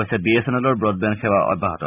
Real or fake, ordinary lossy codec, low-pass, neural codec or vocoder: real; none; 3.6 kHz; none